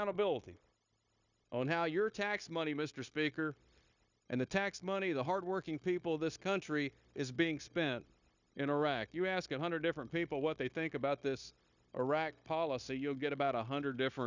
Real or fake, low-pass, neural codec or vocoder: fake; 7.2 kHz; codec, 16 kHz, 0.9 kbps, LongCat-Audio-Codec